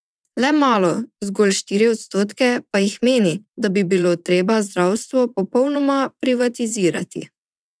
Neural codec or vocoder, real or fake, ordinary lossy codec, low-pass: vocoder, 22.05 kHz, 80 mel bands, WaveNeXt; fake; none; none